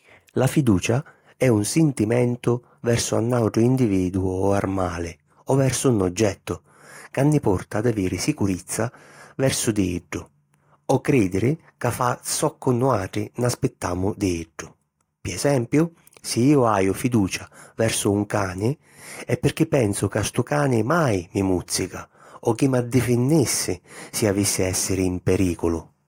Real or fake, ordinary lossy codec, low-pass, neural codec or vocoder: real; AAC, 48 kbps; 19.8 kHz; none